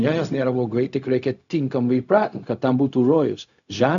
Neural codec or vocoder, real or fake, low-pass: codec, 16 kHz, 0.4 kbps, LongCat-Audio-Codec; fake; 7.2 kHz